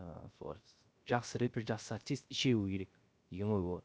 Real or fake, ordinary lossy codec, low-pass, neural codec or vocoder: fake; none; none; codec, 16 kHz, 0.3 kbps, FocalCodec